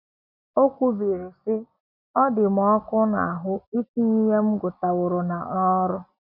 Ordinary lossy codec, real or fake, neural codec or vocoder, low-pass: none; real; none; 5.4 kHz